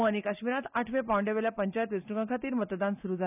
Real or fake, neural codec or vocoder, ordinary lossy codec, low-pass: real; none; none; 3.6 kHz